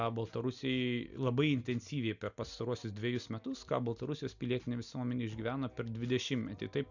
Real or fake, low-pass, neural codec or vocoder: real; 7.2 kHz; none